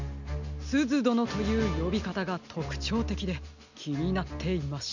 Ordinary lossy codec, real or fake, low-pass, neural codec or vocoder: none; real; 7.2 kHz; none